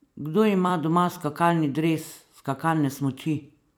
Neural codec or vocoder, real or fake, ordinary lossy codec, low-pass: vocoder, 44.1 kHz, 128 mel bands every 256 samples, BigVGAN v2; fake; none; none